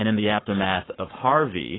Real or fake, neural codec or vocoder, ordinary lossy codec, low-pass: fake; codec, 44.1 kHz, 7.8 kbps, Pupu-Codec; AAC, 16 kbps; 7.2 kHz